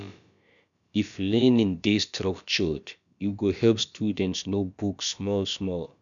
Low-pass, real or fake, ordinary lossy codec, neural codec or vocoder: 7.2 kHz; fake; none; codec, 16 kHz, about 1 kbps, DyCAST, with the encoder's durations